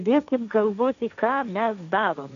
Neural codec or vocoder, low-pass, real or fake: codec, 16 kHz, 1.1 kbps, Voila-Tokenizer; 7.2 kHz; fake